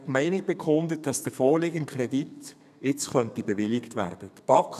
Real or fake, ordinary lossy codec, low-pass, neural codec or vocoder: fake; none; 14.4 kHz; codec, 32 kHz, 1.9 kbps, SNAC